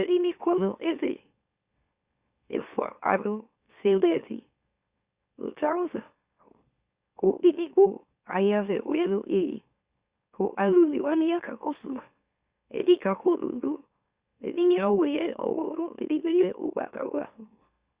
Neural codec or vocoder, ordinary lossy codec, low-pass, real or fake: autoencoder, 44.1 kHz, a latent of 192 numbers a frame, MeloTTS; Opus, 64 kbps; 3.6 kHz; fake